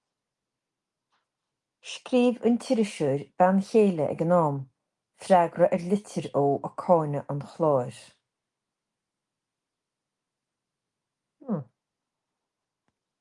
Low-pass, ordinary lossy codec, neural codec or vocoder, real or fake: 10.8 kHz; Opus, 32 kbps; none; real